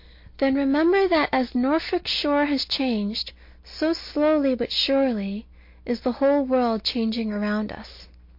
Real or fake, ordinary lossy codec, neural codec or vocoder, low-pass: real; MP3, 32 kbps; none; 5.4 kHz